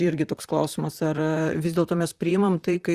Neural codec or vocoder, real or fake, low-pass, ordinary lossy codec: vocoder, 44.1 kHz, 128 mel bands, Pupu-Vocoder; fake; 14.4 kHz; Opus, 64 kbps